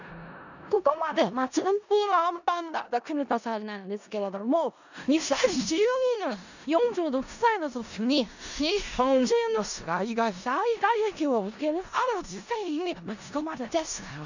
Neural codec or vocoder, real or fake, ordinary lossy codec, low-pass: codec, 16 kHz in and 24 kHz out, 0.4 kbps, LongCat-Audio-Codec, four codebook decoder; fake; none; 7.2 kHz